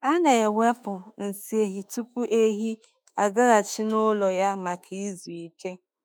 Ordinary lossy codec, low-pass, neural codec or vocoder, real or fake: none; none; autoencoder, 48 kHz, 32 numbers a frame, DAC-VAE, trained on Japanese speech; fake